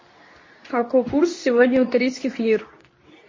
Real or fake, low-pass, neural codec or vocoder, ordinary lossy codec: fake; 7.2 kHz; codec, 24 kHz, 0.9 kbps, WavTokenizer, medium speech release version 2; MP3, 32 kbps